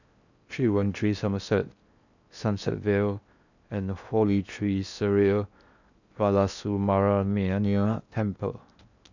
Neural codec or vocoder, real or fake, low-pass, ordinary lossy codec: codec, 16 kHz in and 24 kHz out, 0.8 kbps, FocalCodec, streaming, 65536 codes; fake; 7.2 kHz; none